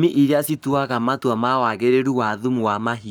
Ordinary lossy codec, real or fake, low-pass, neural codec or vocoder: none; fake; none; codec, 44.1 kHz, 7.8 kbps, Pupu-Codec